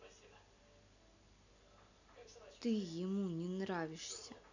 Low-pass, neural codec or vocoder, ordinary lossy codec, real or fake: 7.2 kHz; none; none; real